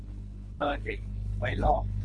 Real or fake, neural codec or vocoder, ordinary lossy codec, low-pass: fake; codec, 24 kHz, 3 kbps, HILCodec; MP3, 48 kbps; 10.8 kHz